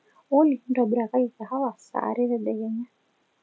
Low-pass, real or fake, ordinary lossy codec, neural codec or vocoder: none; real; none; none